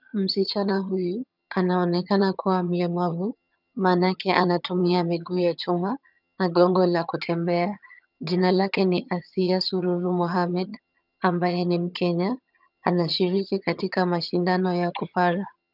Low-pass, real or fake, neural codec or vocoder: 5.4 kHz; fake; vocoder, 22.05 kHz, 80 mel bands, HiFi-GAN